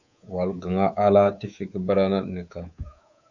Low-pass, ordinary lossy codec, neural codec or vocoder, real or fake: 7.2 kHz; Opus, 64 kbps; codec, 24 kHz, 3.1 kbps, DualCodec; fake